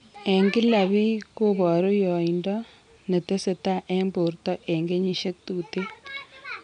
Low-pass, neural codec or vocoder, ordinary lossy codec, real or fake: 9.9 kHz; none; none; real